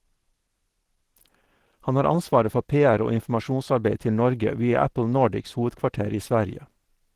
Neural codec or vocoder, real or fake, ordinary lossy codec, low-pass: none; real; Opus, 16 kbps; 14.4 kHz